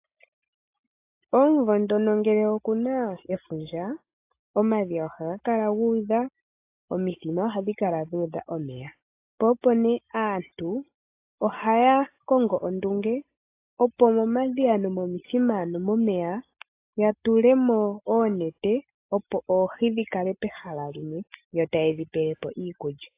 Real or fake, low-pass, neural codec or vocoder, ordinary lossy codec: real; 3.6 kHz; none; AAC, 24 kbps